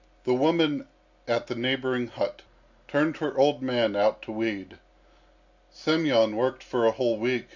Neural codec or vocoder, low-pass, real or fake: none; 7.2 kHz; real